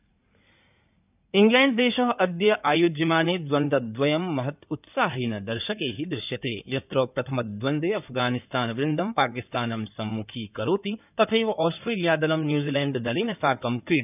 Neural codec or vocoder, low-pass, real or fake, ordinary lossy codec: codec, 16 kHz in and 24 kHz out, 2.2 kbps, FireRedTTS-2 codec; 3.6 kHz; fake; none